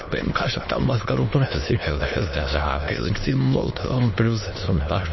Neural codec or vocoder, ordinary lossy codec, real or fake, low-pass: autoencoder, 22.05 kHz, a latent of 192 numbers a frame, VITS, trained on many speakers; MP3, 24 kbps; fake; 7.2 kHz